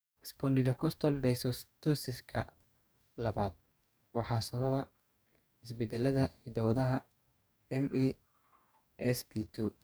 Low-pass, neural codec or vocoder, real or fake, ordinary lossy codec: none; codec, 44.1 kHz, 2.6 kbps, DAC; fake; none